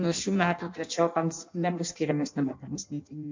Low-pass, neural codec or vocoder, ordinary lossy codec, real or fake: 7.2 kHz; codec, 16 kHz in and 24 kHz out, 0.6 kbps, FireRedTTS-2 codec; MP3, 64 kbps; fake